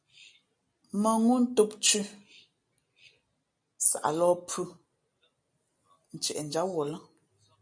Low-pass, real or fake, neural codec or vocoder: 9.9 kHz; real; none